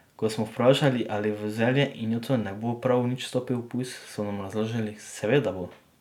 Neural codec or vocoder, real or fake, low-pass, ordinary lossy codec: none; real; 19.8 kHz; none